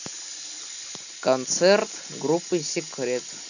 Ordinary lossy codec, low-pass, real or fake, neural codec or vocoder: none; 7.2 kHz; real; none